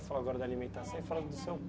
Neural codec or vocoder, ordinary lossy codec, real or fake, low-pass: none; none; real; none